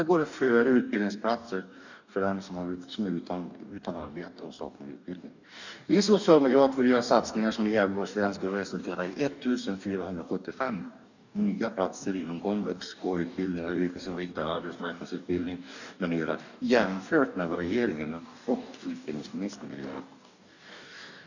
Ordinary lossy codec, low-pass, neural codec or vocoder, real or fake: none; 7.2 kHz; codec, 44.1 kHz, 2.6 kbps, DAC; fake